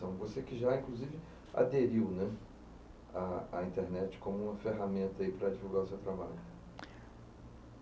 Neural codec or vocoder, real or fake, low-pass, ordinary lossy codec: none; real; none; none